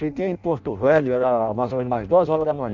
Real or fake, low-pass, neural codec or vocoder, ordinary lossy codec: fake; 7.2 kHz; codec, 16 kHz in and 24 kHz out, 0.6 kbps, FireRedTTS-2 codec; none